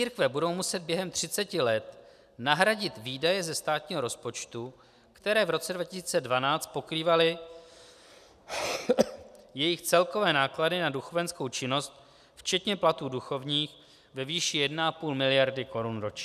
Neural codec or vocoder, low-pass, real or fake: none; 14.4 kHz; real